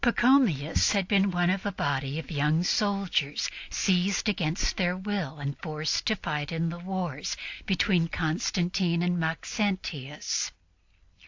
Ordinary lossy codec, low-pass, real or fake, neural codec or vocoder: AAC, 48 kbps; 7.2 kHz; real; none